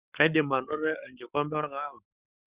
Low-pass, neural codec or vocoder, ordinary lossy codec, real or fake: 3.6 kHz; codec, 44.1 kHz, 7.8 kbps, Pupu-Codec; Opus, 64 kbps; fake